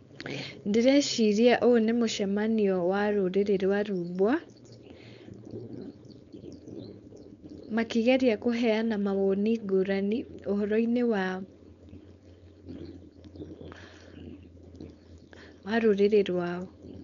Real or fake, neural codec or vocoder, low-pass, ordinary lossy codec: fake; codec, 16 kHz, 4.8 kbps, FACodec; 7.2 kHz; none